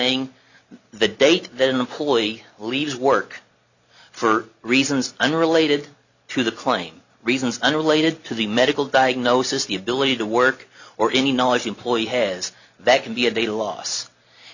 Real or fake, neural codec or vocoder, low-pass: real; none; 7.2 kHz